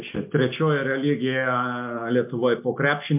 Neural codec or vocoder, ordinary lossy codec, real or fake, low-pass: none; MP3, 32 kbps; real; 3.6 kHz